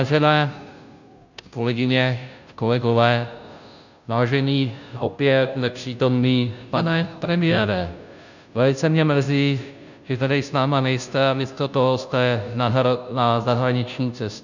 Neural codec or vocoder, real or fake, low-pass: codec, 16 kHz, 0.5 kbps, FunCodec, trained on Chinese and English, 25 frames a second; fake; 7.2 kHz